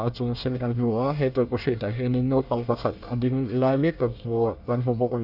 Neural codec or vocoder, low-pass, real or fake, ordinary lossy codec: codec, 24 kHz, 1 kbps, SNAC; 5.4 kHz; fake; Opus, 64 kbps